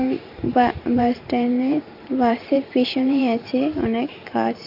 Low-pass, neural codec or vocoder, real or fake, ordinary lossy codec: 5.4 kHz; vocoder, 44.1 kHz, 128 mel bands every 512 samples, BigVGAN v2; fake; none